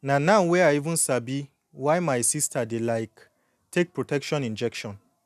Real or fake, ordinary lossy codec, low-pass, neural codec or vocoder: real; none; 14.4 kHz; none